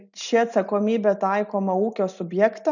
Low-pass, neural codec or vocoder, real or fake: 7.2 kHz; none; real